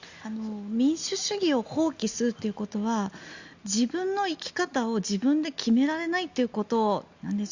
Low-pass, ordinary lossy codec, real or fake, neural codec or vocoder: 7.2 kHz; Opus, 64 kbps; real; none